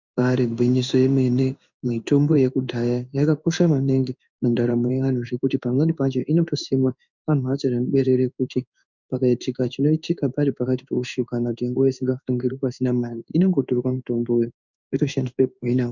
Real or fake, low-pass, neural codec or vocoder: fake; 7.2 kHz; codec, 16 kHz in and 24 kHz out, 1 kbps, XY-Tokenizer